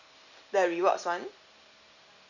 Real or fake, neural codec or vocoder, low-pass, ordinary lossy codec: real; none; 7.2 kHz; none